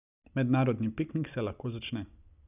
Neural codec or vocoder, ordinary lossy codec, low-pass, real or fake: none; none; 3.6 kHz; real